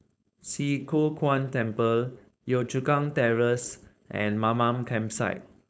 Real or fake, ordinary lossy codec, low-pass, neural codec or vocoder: fake; none; none; codec, 16 kHz, 4.8 kbps, FACodec